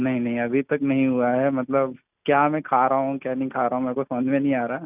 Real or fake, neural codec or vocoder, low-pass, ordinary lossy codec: real; none; 3.6 kHz; none